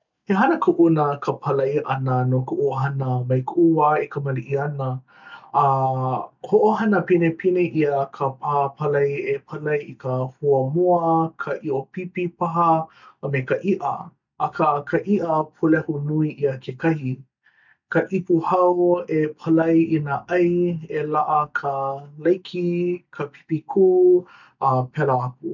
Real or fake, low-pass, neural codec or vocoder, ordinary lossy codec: real; 7.2 kHz; none; none